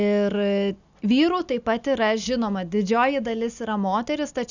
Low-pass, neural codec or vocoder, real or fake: 7.2 kHz; none; real